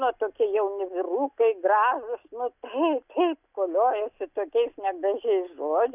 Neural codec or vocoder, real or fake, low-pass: none; real; 3.6 kHz